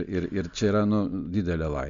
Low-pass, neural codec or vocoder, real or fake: 7.2 kHz; none; real